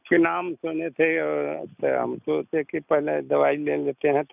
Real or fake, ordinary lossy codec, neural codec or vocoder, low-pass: real; none; none; 3.6 kHz